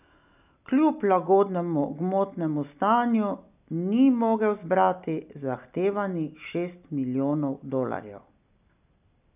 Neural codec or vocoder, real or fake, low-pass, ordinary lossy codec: none; real; 3.6 kHz; none